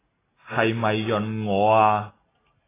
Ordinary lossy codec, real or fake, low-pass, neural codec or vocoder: AAC, 16 kbps; real; 3.6 kHz; none